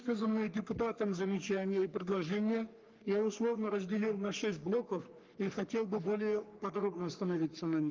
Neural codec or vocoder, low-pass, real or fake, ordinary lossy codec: codec, 44.1 kHz, 3.4 kbps, Pupu-Codec; 7.2 kHz; fake; Opus, 32 kbps